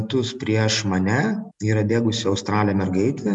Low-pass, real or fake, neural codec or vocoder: 10.8 kHz; real; none